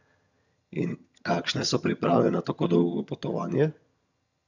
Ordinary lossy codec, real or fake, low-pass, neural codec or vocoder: none; fake; 7.2 kHz; vocoder, 22.05 kHz, 80 mel bands, HiFi-GAN